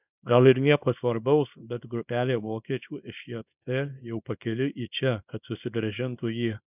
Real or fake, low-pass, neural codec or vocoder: fake; 3.6 kHz; codec, 24 kHz, 0.9 kbps, WavTokenizer, small release